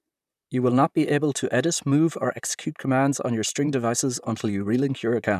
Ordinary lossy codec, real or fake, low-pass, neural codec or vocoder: none; fake; 14.4 kHz; vocoder, 44.1 kHz, 128 mel bands, Pupu-Vocoder